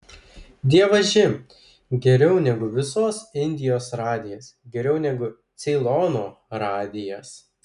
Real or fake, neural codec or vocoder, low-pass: real; none; 10.8 kHz